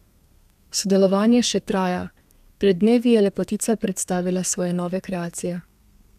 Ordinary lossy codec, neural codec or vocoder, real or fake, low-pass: none; codec, 32 kHz, 1.9 kbps, SNAC; fake; 14.4 kHz